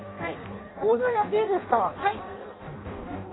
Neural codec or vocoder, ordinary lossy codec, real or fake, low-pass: codec, 16 kHz in and 24 kHz out, 0.6 kbps, FireRedTTS-2 codec; AAC, 16 kbps; fake; 7.2 kHz